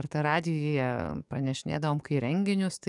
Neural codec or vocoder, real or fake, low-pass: codec, 44.1 kHz, 7.8 kbps, DAC; fake; 10.8 kHz